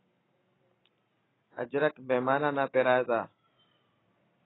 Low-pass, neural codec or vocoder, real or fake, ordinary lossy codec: 7.2 kHz; none; real; AAC, 16 kbps